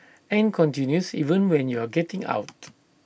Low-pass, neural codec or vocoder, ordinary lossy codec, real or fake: none; none; none; real